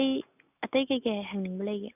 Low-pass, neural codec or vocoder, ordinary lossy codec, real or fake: 3.6 kHz; none; none; real